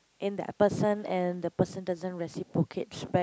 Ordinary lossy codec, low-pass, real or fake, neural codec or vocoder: none; none; real; none